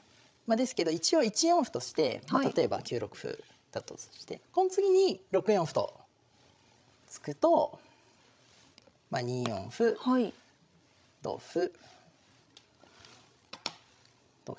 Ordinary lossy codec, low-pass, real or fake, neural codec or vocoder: none; none; fake; codec, 16 kHz, 16 kbps, FreqCodec, larger model